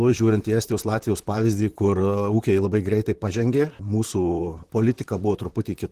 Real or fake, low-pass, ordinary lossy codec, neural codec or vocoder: fake; 14.4 kHz; Opus, 16 kbps; vocoder, 44.1 kHz, 128 mel bands, Pupu-Vocoder